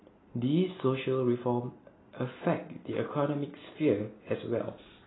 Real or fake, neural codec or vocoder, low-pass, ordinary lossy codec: real; none; 7.2 kHz; AAC, 16 kbps